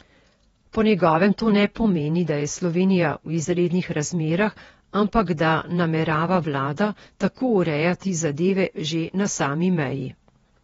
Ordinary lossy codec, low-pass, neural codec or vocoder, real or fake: AAC, 24 kbps; 19.8 kHz; vocoder, 48 kHz, 128 mel bands, Vocos; fake